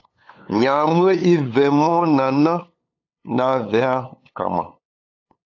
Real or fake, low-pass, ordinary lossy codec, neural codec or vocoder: fake; 7.2 kHz; AAC, 48 kbps; codec, 16 kHz, 8 kbps, FunCodec, trained on LibriTTS, 25 frames a second